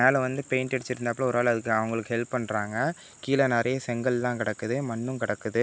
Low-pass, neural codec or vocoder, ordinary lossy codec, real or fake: none; none; none; real